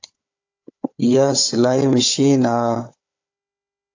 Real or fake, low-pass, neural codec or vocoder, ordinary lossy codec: fake; 7.2 kHz; codec, 16 kHz, 4 kbps, FunCodec, trained on Chinese and English, 50 frames a second; AAC, 48 kbps